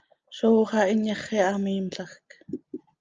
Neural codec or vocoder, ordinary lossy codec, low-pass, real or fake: none; Opus, 24 kbps; 7.2 kHz; real